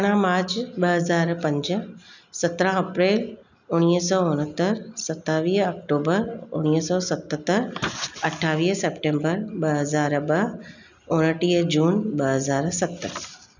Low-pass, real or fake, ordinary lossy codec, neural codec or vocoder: 7.2 kHz; real; none; none